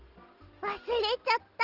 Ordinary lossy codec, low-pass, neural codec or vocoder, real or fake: Opus, 16 kbps; 5.4 kHz; none; real